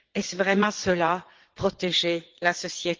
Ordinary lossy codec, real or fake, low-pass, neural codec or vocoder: Opus, 16 kbps; fake; 7.2 kHz; vocoder, 22.05 kHz, 80 mel bands, WaveNeXt